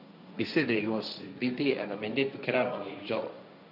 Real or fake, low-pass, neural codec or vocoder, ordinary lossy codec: fake; 5.4 kHz; codec, 16 kHz, 1.1 kbps, Voila-Tokenizer; none